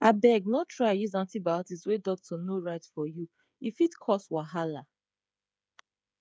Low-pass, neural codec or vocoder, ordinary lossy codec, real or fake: none; codec, 16 kHz, 8 kbps, FreqCodec, smaller model; none; fake